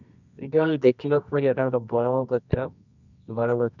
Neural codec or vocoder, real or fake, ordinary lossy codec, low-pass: codec, 24 kHz, 0.9 kbps, WavTokenizer, medium music audio release; fake; none; 7.2 kHz